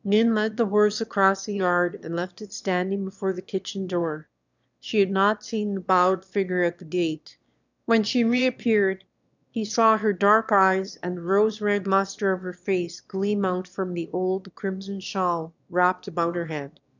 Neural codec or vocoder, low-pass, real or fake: autoencoder, 22.05 kHz, a latent of 192 numbers a frame, VITS, trained on one speaker; 7.2 kHz; fake